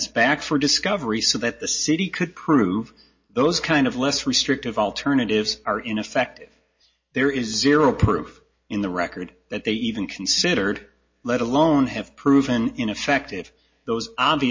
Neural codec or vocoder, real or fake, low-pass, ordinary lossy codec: none; real; 7.2 kHz; MP3, 32 kbps